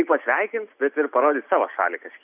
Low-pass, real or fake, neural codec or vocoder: 3.6 kHz; real; none